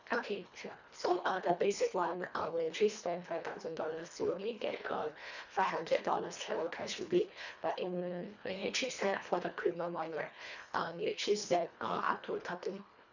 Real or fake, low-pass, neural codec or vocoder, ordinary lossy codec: fake; 7.2 kHz; codec, 24 kHz, 1.5 kbps, HILCodec; none